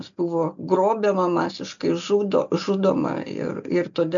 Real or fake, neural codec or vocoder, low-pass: real; none; 7.2 kHz